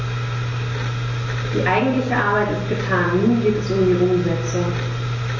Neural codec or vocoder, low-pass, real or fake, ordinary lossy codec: none; 7.2 kHz; real; MP3, 32 kbps